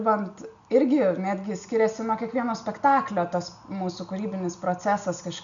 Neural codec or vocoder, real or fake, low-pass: none; real; 7.2 kHz